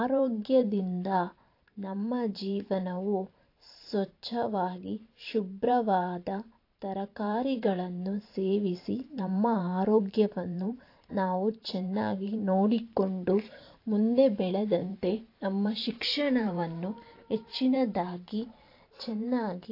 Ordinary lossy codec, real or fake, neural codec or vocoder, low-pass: AAC, 32 kbps; fake; vocoder, 44.1 kHz, 128 mel bands every 512 samples, BigVGAN v2; 5.4 kHz